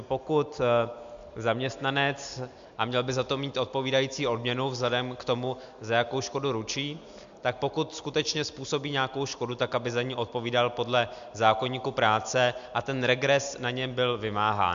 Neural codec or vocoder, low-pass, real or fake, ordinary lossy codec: none; 7.2 kHz; real; MP3, 64 kbps